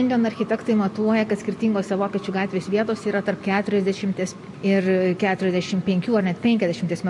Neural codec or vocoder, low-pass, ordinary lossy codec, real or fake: none; 10.8 kHz; MP3, 48 kbps; real